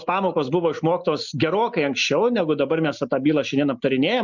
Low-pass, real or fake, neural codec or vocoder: 7.2 kHz; real; none